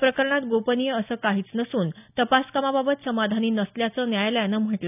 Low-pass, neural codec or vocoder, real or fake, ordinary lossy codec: 3.6 kHz; none; real; none